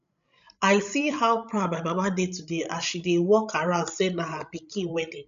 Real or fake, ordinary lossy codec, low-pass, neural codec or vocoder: fake; none; 7.2 kHz; codec, 16 kHz, 16 kbps, FreqCodec, larger model